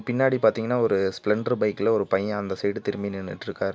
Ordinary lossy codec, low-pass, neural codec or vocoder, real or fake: none; none; none; real